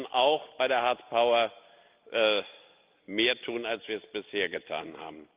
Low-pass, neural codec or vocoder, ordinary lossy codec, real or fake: 3.6 kHz; none; Opus, 32 kbps; real